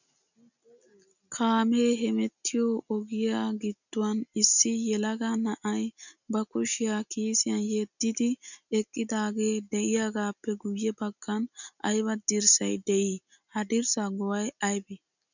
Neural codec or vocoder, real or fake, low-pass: none; real; 7.2 kHz